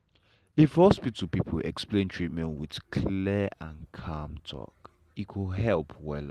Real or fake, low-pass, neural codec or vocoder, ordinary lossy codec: real; 14.4 kHz; none; Opus, 24 kbps